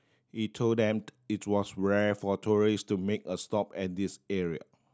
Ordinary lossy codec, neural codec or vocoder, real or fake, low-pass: none; none; real; none